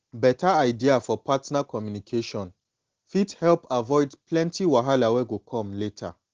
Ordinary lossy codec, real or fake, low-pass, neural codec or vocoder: Opus, 16 kbps; real; 7.2 kHz; none